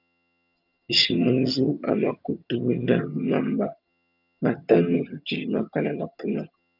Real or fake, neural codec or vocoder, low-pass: fake; vocoder, 22.05 kHz, 80 mel bands, HiFi-GAN; 5.4 kHz